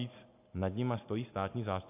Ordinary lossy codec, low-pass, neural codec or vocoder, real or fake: AAC, 32 kbps; 3.6 kHz; none; real